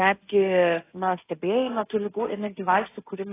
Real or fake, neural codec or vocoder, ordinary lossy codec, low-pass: fake; codec, 16 kHz, 1.1 kbps, Voila-Tokenizer; AAC, 16 kbps; 3.6 kHz